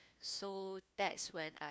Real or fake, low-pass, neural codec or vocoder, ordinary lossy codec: fake; none; codec, 16 kHz, 1 kbps, FunCodec, trained on LibriTTS, 50 frames a second; none